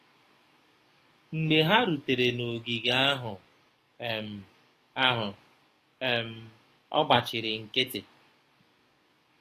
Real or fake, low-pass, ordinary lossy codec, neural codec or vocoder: fake; 14.4 kHz; AAC, 48 kbps; codec, 44.1 kHz, 7.8 kbps, DAC